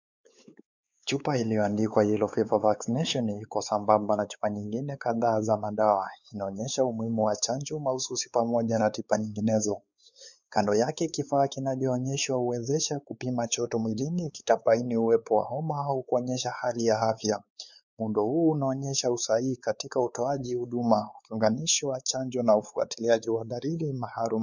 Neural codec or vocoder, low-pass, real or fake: codec, 16 kHz, 4 kbps, X-Codec, WavLM features, trained on Multilingual LibriSpeech; 7.2 kHz; fake